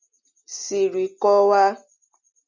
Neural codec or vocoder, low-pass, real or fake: none; 7.2 kHz; real